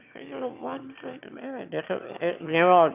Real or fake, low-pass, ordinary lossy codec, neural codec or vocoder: fake; 3.6 kHz; none; autoencoder, 22.05 kHz, a latent of 192 numbers a frame, VITS, trained on one speaker